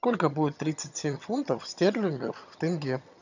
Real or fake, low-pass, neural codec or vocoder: fake; 7.2 kHz; vocoder, 22.05 kHz, 80 mel bands, HiFi-GAN